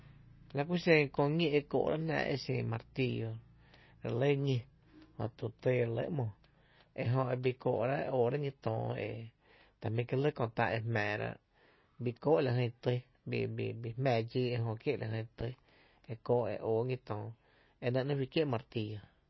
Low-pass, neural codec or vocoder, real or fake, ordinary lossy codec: 7.2 kHz; none; real; MP3, 24 kbps